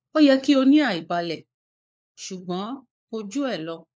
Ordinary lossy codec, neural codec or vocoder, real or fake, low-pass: none; codec, 16 kHz, 4 kbps, FunCodec, trained on LibriTTS, 50 frames a second; fake; none